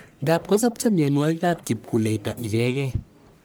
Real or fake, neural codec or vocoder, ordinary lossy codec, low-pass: fake; codec, 44.1 kHz, 1.7 kbps, Pupu-Codec; none; none